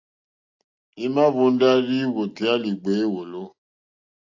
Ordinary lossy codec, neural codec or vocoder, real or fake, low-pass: AAC, 48 kbps; none; real; 7.2 kHz